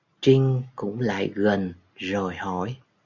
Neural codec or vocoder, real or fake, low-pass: none; real; 7.2 kHz